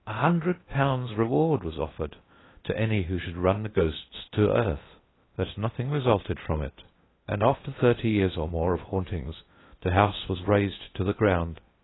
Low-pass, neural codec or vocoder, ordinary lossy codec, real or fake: 7.2 kHz; codec, 16 kHz in and 24 kHz out, 0.6 kbps, FocalCodec, streaming, 4096 codes; AAC, 16 kbps; fake